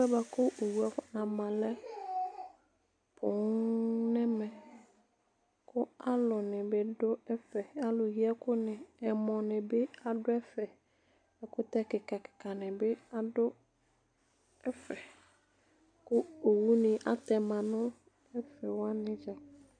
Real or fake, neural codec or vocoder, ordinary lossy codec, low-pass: real; none; MP3, 64 kbps; 9.9 kHz